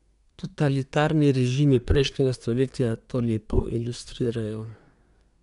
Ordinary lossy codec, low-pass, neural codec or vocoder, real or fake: none; 10.8 kHz; codec, 24 kHz, 1 kbps, SNAC; fake